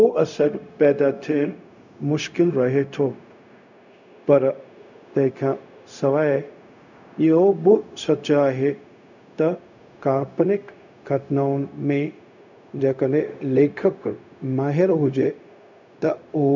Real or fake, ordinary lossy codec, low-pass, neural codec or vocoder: fake; none; 7.2 kHz; codec, 16 kHz, 0.4 kbps, LongCat-Audio-Codec